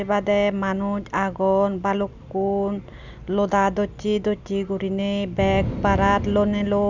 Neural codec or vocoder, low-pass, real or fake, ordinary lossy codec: none; 7.2 kHz; real; none